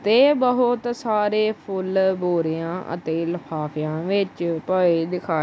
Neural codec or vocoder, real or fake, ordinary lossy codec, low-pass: none; real; none; none